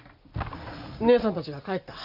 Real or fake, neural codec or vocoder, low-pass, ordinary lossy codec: real; none; 5.4 kHz; none